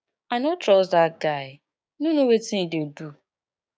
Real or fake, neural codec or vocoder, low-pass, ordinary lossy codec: fake; codec, 16 kHz, 6 kbps, DAC; none; none